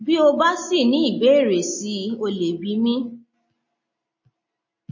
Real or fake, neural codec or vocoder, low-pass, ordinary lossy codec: real; none; 7.2 kHz; MP3, 32 kbps